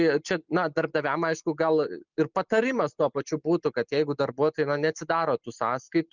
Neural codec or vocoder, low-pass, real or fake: none; 7.2 kHz; real